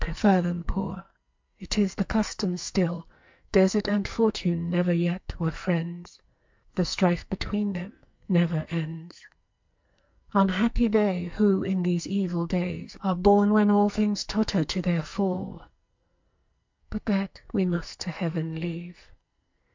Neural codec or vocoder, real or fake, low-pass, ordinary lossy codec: codec, 44.1 kHz, 2.6 kbps, SNAC; fake; 7.2 kHz; MP3, 64 kbps